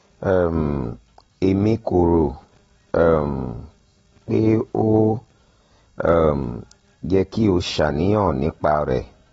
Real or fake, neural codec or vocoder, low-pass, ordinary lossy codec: real; none; 10.8 kHz; AAC, 24 kbps